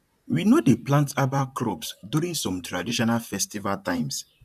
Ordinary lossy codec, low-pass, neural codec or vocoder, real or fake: none; 14.4 kHz; vocoder, 44.1 kHz, 128 mel bands, Pupu-Vocoder; fake